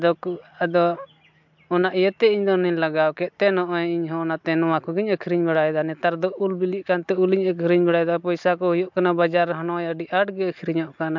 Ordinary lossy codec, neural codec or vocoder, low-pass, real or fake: MP3, 64 kbps; none; 7.2 kHz; real